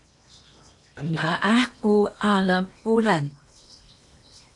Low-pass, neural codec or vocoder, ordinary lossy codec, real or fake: 10.8 kHz; codec, 16 kHz in and 24 kHz out, 0.8 kbps, FocalCodec, streaming, 65536 codes; AAC, 64 kbps; fake